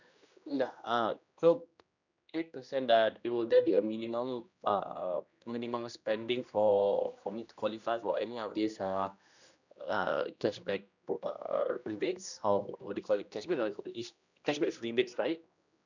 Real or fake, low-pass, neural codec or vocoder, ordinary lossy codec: fake; 7.2 kHz; codec, 16 kHz, 1 kbps, X-Codec, HuBERT features, trained on general audio; none